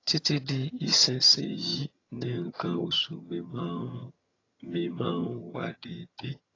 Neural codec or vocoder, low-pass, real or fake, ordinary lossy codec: vocoder, 22.05 kHz, 80 mel bands, HiFi-GAN; 7.2 kHz; fake; AAC, 32 kbps